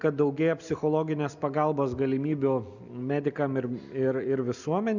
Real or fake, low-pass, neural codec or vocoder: real; 7.2 kHz; none